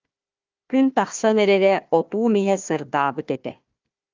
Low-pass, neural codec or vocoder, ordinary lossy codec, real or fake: 7.2 kHz; codec, 16 kHz, 1 kbps, FunCodec, trained on Chinese and English, 50 frames a second; Opus, 24 kbps; fake